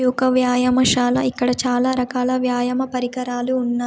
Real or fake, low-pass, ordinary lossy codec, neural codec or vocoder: real; none; none; none